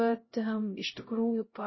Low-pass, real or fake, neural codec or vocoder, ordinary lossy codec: 7.2 kHz; fake; codec, 16 kHz, 0.5 kbps, X-Codec, HuBERT features, trained on LibriSpeech; MP3, 24 kbps